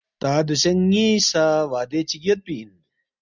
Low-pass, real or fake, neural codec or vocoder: 7.2 kHz; real; none